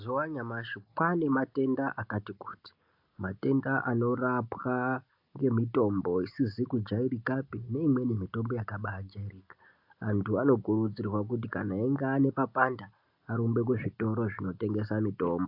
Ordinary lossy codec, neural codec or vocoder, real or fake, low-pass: AAC, 48 kbps; none; real; 5.4 kHz